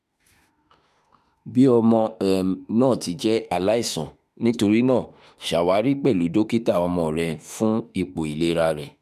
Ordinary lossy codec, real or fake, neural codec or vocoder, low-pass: none; fake; autoencoder, 48 kHz, 32 numbers a frame, DAC-VAE, trained on Japanese speech; 14.4 kHz